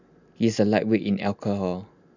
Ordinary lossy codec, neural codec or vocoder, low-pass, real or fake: none; none; 7.2 kHz; real